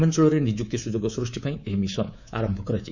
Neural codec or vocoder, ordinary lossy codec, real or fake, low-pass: codec, 24 kHz, 3.1 kbps, DualCodec; none; fake; 7.2 kHz